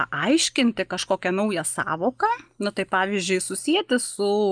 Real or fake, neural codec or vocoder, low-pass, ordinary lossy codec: real; none; 9.9 kHz; Opus, 32 kbps